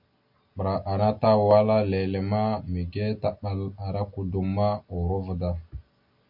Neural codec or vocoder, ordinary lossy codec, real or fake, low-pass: none; MP3, 48 kbps; real; 5.4 kHz